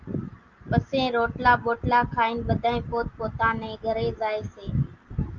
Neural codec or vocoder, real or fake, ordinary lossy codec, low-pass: none; real; Opus, 24 kbps; 7.2 kHz